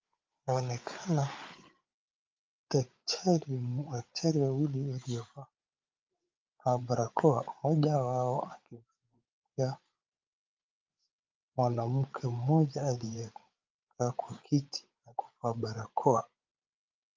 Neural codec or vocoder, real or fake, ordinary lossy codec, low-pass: codec, 16 kHz, 8 kbps, FreqCodec, larger model; fake; Opus, 24 kbps; 7.2 kHz